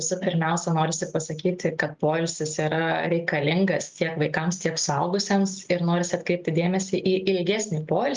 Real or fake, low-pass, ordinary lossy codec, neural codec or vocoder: real; 7.2 kHz; Opus, 32 kbps; none